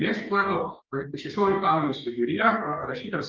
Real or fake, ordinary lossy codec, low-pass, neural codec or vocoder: fake; Opus, 24 kbps; 7.2 kHz; codec, 44.1 kHz, 2.6 kbps, DAC